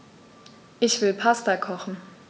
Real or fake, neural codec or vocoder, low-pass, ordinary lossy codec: real; none; none; none